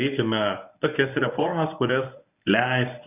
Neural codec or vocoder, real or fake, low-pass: codec, 24 kHz, 0.9 kbps, WavTokenizer, medium speech release version 1; fake; 3.6 kHz